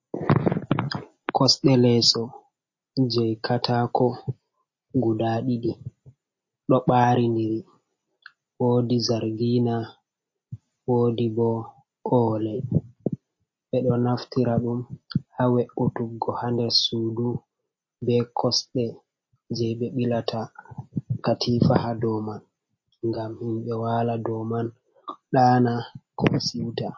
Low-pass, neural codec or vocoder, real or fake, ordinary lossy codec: 7.2 kHz; none; real; MP3, 32 kbps